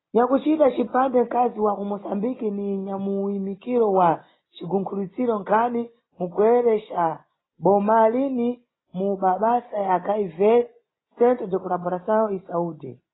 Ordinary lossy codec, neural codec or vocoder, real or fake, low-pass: AAC, 16 kbps; none; real; 7.2 kHz